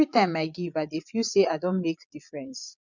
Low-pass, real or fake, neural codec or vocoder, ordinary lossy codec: 7.2 kHz; fake; vocoder, 22.05 kHz, 80 mel bands, Vocos; none